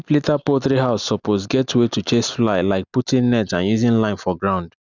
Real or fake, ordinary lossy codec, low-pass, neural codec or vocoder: real; none; 7.2 kHz; none